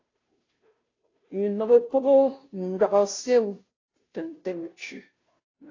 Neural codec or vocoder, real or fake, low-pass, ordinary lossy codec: codec, 16 kHz, 0.5 kbps, FunCodec, trained on Chinese and English, 25 frames a second; fake; 7.2 kHz; AAC, 32 kbps